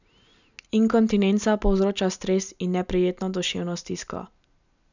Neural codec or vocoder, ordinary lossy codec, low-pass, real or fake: none; none; 7.2 kHz; real